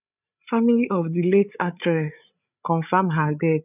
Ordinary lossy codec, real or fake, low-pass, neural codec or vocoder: none; fake; 3.6 kHz; codec, 16 kHz, 8 kbps, FreqCodec, larger model